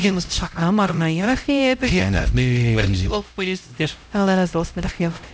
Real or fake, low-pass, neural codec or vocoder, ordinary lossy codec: fake; none; codec, 16 kHz, 0.5 kbps, X-Codec, HuBERT features, trained on LibriSpeech; none